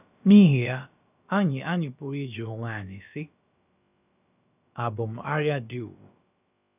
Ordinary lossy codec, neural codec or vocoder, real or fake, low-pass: none; codec, 16 kHz, about 1 kbps, DyCAST, with the encoder's durations; fake; 3.6 kHz